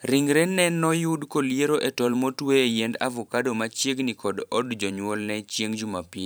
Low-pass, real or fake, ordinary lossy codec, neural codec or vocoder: none; fake; none; vocoder, 44.1 kHz, 128 mel bands every 512 samples, BigVGAN v2